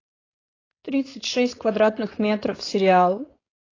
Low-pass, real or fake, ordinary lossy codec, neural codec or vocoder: 7.2 kHz; fake; AAC, 32 kbps; codec, 16 kHz, 4.8 kbps, FACodec